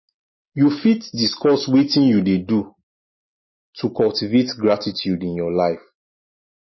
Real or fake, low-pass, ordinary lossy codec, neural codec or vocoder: real; 7.2 kHz; MP3, 24 kbps; none